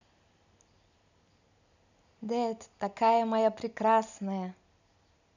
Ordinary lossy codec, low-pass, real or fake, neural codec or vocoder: none; 7.2 kHz; real; none